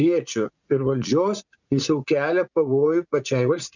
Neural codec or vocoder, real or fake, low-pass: vocoder, 44.1 kHz, 80 mel bands, Vocos; fake; 7.2 kHz